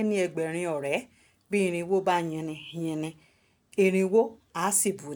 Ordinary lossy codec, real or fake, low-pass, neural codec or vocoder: none; real; none; none